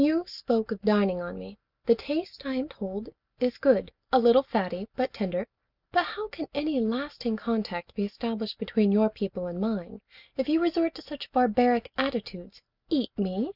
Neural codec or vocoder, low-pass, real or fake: none; 5.4 kHz; real